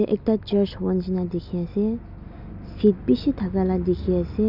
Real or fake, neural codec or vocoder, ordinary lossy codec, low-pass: real; none; none; 5.4 kHz